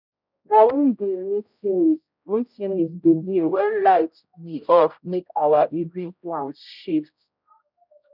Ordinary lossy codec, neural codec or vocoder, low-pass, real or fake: none; codec, 16 kHz, 0.5 kbps, X-Codec, HuBERT features, trained on general audio; 5.4 kHz; fake